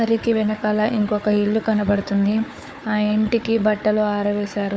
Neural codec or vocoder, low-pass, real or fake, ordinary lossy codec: codec, 16 kHz, 4 kbps, FunCodec, trained on Chinese and English, 50 frames a second; none; fake; none